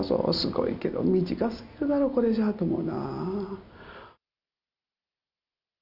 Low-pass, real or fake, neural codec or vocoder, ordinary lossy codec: 5.4 kHz; real; none; Opus, 64 kbps